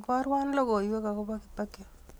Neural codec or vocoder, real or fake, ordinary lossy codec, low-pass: none; real; none; none